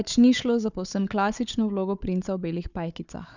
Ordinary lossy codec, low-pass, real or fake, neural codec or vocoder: none; 7.2 kHz; fake; codec, 16 kHz, 4 kbps, FunCodec, trained on Chinese and English, 50 frames a second